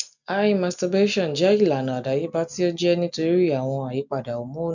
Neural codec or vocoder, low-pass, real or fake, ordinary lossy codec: none; 7.2 kHz; real; none